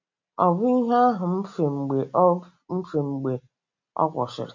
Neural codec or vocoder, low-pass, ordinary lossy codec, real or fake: none; 7.2 kHz; MP3, 64 kbps; real